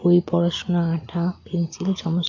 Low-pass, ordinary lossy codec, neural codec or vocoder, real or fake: 7.2 kHz; AAC, 48 kbps; none; real